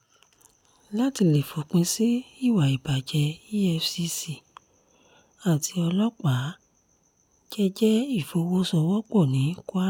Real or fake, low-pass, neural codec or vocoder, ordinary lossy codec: real; 19.8 kHz; none; none